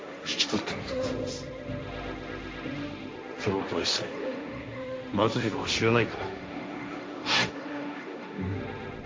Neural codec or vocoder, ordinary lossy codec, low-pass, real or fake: codec, 16 kHz, 1.1 kbps, Voila-Tokenizer; none; none; fake